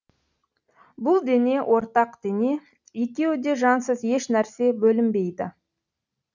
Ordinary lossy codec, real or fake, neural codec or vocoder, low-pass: none; real; none; 7.2 kHz